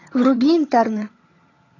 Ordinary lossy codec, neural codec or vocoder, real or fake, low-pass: AAC, 32 kbps; vocoder, 22.05 kHz, 80 mel bands, HiFi-GAN; fake; 7.2 kHz